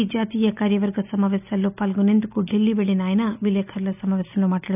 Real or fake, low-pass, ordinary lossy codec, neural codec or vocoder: real; 3.6 kHz; none; none